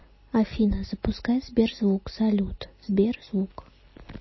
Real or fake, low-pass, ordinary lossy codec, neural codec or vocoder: real; 7.2 kHz; MP3, 24 kbps; none